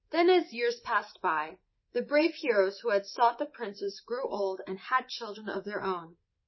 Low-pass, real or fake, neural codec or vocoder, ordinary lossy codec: 7.2 kHz; fake; codec, 24 kHz, 3.1 kbps, DualCodec; MP3, 24 kbps